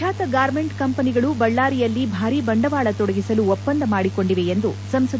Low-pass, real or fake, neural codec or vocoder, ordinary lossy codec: none; real; none; none